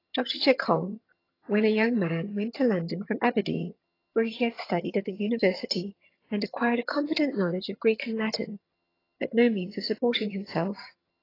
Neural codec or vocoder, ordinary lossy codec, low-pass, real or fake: vocoder, 22.05 kHz, 80 mel bands, HiFi-GAN; AAC, 24 kbps; 5.4 kHz; fake